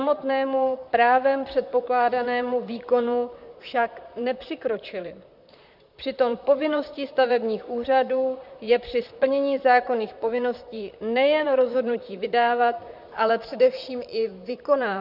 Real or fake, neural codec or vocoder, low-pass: fake; vocoder, 44.1 kHz, 128 mel bands, Pupu-Vocoder; 5.4 kHz